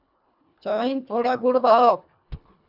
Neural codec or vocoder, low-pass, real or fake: codec, 24 kHz, 1.5 kbps, HILCodec; 5.4 kHz; fake